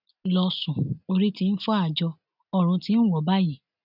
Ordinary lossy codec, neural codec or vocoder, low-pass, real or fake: none; none; 5.4 kHz; real